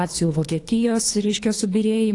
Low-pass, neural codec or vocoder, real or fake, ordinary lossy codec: 10.8 kHz; codec, 24 kHz, 3 kbps, HILCodec; fake; AAC, 48 kbps